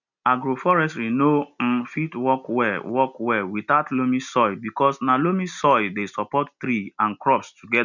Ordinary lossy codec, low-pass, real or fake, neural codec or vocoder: none; 7.2 kHz; real; none